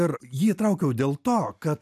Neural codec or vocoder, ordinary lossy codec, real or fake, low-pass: none; AAC, 96 kbps; real; 14.4 kHz